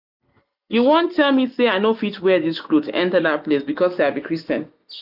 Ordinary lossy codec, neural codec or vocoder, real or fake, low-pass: none; none; real; 5.4 kHz